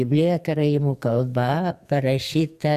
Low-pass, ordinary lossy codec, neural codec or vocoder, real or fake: 14.4 kHz; Opus, 64 kbps; codec, 44.1 kHz, 2.6 kbps, SNAC; fake